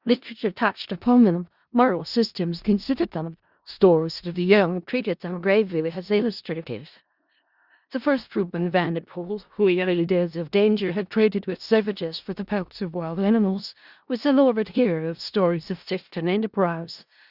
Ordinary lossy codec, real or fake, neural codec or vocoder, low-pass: Opus, 64 kbps; fake; codec, 16 kHz in and 24 kHz out, 0.4 kbps, LongCat-Audio-Codec, four codebook decoder; 5.4 kHz